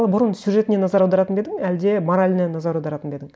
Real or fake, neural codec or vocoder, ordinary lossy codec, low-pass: real; none; none; none